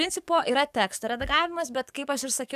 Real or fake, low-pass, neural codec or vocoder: fake; 14.4 kHz; codec, 44.1 kHz, 7.8 kbps, DAC